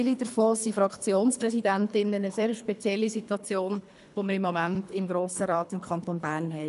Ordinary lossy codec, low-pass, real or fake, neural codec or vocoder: none; 10.8 kHz; fake; codec, 24 kHz, 3 kbps, HILCodec